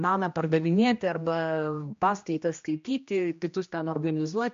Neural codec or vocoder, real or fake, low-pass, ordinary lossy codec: codec, 16 kHz, 1 kbps, X-Codec, HuBERT features, trained on general audio; fake; 7.2 kHz; MP3, 48 kbps